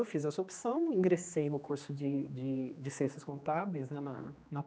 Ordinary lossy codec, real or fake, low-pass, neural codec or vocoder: none; fake; none; codec, 16 kHz, 2 kbps, X-Codec, HuBERT features, trained on general audio